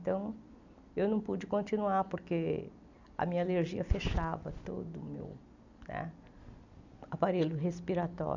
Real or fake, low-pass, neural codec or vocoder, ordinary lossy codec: real; 7.2 kHz; none; none